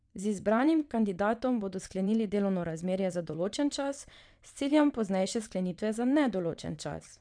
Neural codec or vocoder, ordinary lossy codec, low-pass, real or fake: vocoder, 22.05 kHz, 80 mel bands, WaveNeXt; none; 9.9 kHz; fake